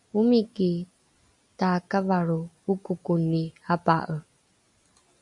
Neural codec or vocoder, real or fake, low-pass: none; real; 10.8 kHz